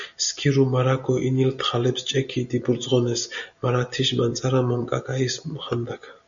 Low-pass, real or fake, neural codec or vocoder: 7.2 kHz; real; none